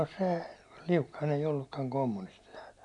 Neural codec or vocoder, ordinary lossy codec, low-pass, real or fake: none; none; 10.8 kHz; real